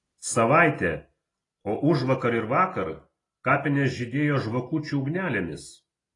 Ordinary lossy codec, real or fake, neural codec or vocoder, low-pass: AAC, 32 kbps; real; none; 10.8 kHz